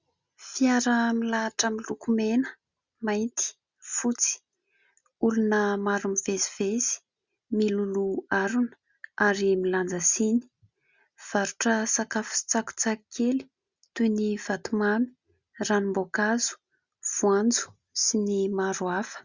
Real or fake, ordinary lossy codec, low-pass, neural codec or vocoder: real; Opus, 64 kbps; 7.2 kHz; none